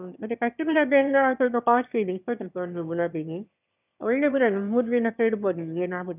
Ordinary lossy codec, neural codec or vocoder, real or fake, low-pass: none; autoencoder, 22.05 kHz, a latent of 192 numbers a frame, VITS, trained on one speaker; fake; 3.6 kHz